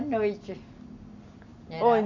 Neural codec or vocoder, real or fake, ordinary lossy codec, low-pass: none; real; none; 7.2 kHz